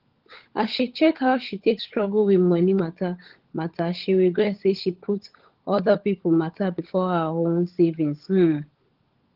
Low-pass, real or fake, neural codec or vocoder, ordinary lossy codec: 5.4 kHz; fake; codec, 16 kHz, 16 kbps, FunCodec, trained on LibriTTS, 50 frames a second; Opus, 16 kbps